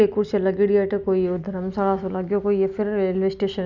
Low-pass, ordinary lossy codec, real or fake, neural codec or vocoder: 7.2 kHz; none; real; none